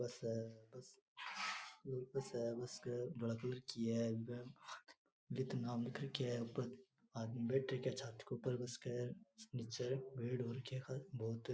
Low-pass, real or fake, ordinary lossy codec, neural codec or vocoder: none; real; none; none